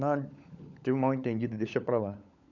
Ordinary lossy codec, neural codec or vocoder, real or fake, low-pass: none; codec, 16 kHz, 16 kbps, FunCodec, trained on LibriTTS, 50 frames a second; fake; 7.2 kHz